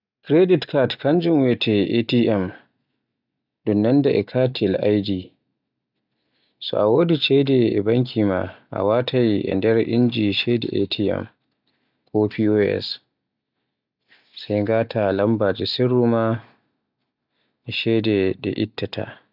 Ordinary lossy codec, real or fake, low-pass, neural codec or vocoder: none; real; 5.4 kHz; none